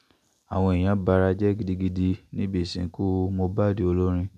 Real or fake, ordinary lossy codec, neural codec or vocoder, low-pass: real; none; none; 14.4 kHz